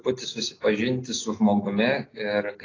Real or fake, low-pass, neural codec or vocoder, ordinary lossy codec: real; 7.2 kHz; none; AAC, 32 kbps